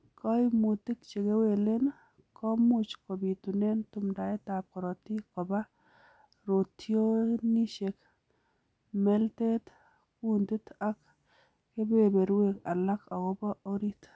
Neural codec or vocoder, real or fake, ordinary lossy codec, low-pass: none; real; none; none